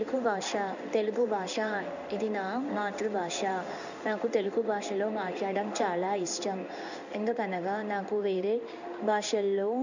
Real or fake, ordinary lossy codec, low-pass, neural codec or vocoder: fake; none; 7.2 kHz; codec, 16 kHz in and 24 kHz out, 1 kbps, XY-Tokenizer